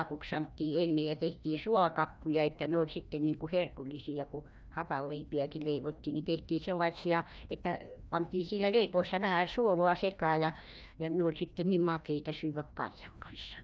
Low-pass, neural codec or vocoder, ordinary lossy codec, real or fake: none; codec, 16 kHz, 1 kbps, FreqCodec, larger model; none; fake